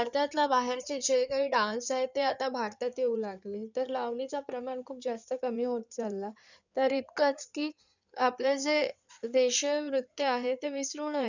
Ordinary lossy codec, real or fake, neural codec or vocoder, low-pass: none; fake; codec, 16 kHz in and 24 kHz out, 2.2 kbps, FireRedTTS-2 codec; 7.2 kHz